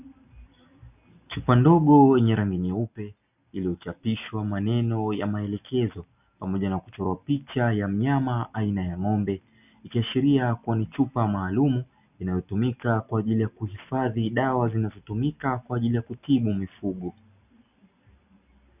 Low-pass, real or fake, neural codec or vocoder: 3.6 kHz; real; none